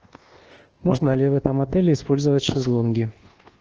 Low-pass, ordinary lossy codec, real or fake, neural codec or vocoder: 7.2 kHz; Opus, 16 kbps; fake; codec, 24 kHz, 1.2 kbps, DualCodec